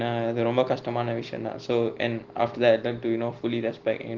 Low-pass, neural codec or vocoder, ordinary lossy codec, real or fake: 7.2 kHz; none; Opus, 16 kbps; real